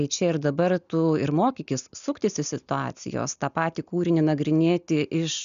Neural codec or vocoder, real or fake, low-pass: none; real; 7.2 kHz